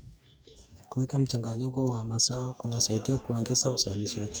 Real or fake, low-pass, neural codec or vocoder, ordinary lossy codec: fake; none; codec, 44.1 kHz, 2.6 kbps, DAC; none